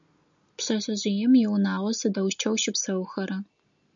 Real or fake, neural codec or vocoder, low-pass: real; none; 7.2 kHz